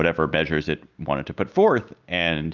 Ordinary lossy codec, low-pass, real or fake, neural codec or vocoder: Opus, 24 kbps; 7.2 kHz; real; none